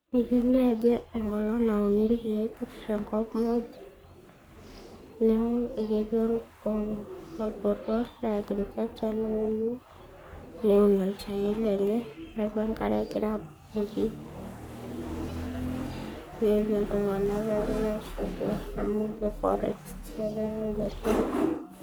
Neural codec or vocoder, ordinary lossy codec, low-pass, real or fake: codec, 44.1 kHz, 3.4 kbps, Pupu-Codec; none; none; fake